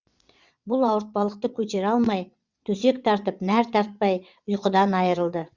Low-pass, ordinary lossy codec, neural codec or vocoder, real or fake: 7.2 kHz; Opus, 64 kbps; vocoder, 22.05 kHz, 80 mel bands, WaveNeXt; fake